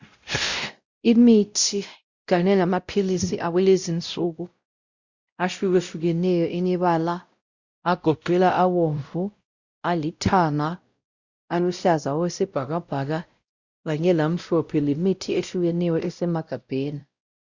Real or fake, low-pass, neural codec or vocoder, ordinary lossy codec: fake; 7.2 kHz; codec, 16 kHz, 0.5 kbps, X-Codec, WavLM features, trained on Multilingual LibriSpeech; Opus, 64 kbps